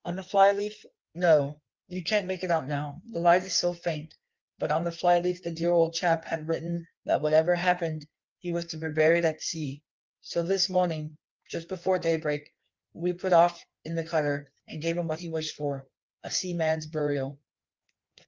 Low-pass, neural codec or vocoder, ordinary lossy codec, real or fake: 7.2 kHz; codec, 16 kHz in and 24 kHz out, 1.1 kbps, FireRedTTS-2 codec; Opus, 24 kbps; fake